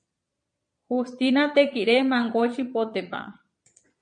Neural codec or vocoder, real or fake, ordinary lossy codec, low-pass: vocoder, 22.05 kHz, 80 mel bands, Vocos; fake; MP3, 48 kbps; 9.9 kHz